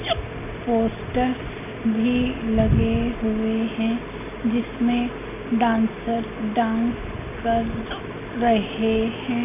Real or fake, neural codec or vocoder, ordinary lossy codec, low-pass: real; none; none; 3.6 kHz